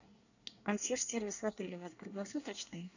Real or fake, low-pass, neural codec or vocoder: fake; 7.2 kHz; codec, 24 kHz, 1 kbps, SNAC